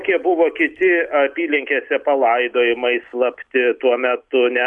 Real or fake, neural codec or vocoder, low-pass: real; none; 10.8 kHz